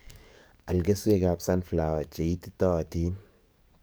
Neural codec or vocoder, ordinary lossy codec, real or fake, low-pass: codec, 44.1 kHz, 7.8 kbps, DAC; none; fake; none